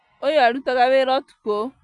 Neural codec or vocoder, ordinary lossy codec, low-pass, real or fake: none; none; 10.8 kHz; real